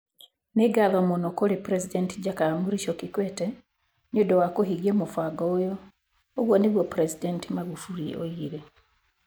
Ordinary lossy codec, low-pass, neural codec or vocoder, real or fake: none; none; none; real